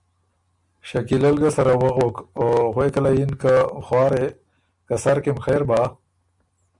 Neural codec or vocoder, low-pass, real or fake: none; 10.8 kHz; real